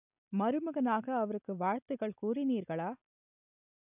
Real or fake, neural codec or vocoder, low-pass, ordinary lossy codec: real; none; 3.6 kHz; none